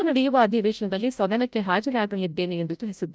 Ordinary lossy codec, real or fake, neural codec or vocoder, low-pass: none; fake; codec, 16 kHz, 0.5 kbps, FreqCodec, larger model; none